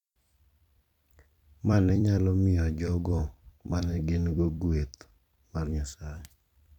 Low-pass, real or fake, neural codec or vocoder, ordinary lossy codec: 19.8 kHz; fake; vocoder, 44.1 kHz, 128 mel bands every 256 samples, BigVGAN v2; none